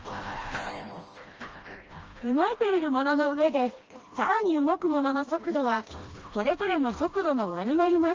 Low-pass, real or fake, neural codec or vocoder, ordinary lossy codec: 7.2 kHz; fake; codec, 16 kHz, 1 kbps, FreqCodec, smaller model; Opus, 24 kbps